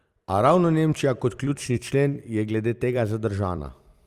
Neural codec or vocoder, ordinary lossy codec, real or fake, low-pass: vocoder, 44.1 kHz, 128 mel bands every 256 samples, BigVGAN v2; Opus, 32 kbps; fake; 14.4 kHz